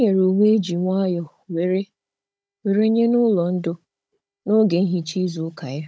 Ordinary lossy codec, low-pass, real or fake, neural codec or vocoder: none; none; fake; codec, 16 kHz, 16 kbps, FunCodec, trained on Chinese and English, 50 frames a second